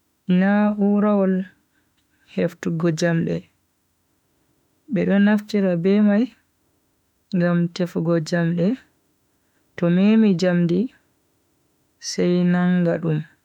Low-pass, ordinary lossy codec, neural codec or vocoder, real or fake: 19.8 kHz; none; autoencoder, 48 kHz, 32 numbers a frame, DAC-VAE, trained on Japanese speech; fake